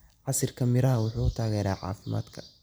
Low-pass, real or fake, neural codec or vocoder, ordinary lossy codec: none; real; none; none